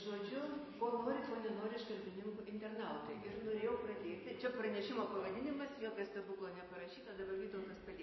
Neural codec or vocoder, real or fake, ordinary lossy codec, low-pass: none; real; MP3, 24 kbps; 7.2 kHz